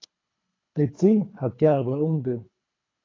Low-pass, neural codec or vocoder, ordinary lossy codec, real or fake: 7.2 kHz; codec, 24 kHz, 3 kbps, HILCodec; AAC, 32 kbps; fake